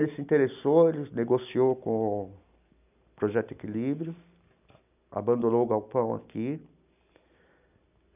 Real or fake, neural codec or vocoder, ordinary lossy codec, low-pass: real; none; none; 3.6 kHz